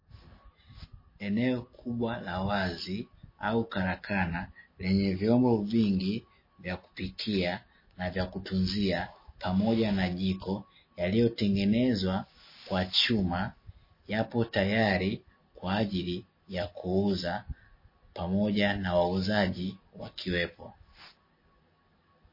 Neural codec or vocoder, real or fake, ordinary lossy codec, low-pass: none; real; MP3, 24 kbps; 5.4 kHz